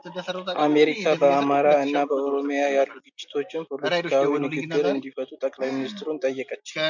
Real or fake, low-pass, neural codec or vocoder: real; 7.2 kHz; none